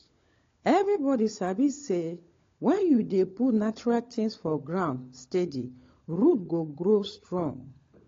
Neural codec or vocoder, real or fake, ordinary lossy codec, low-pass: codec, 16 kHz, 16 kbps, FunCodec, trained on LibriTTS, 50 frames a second; fake; AAC, 32 kbps; 7.2 kHz